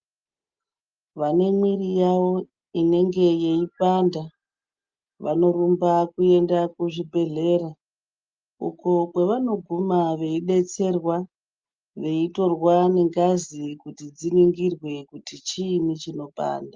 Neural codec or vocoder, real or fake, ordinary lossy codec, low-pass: none; real; Opus, 24 kbps; 7.2 kHz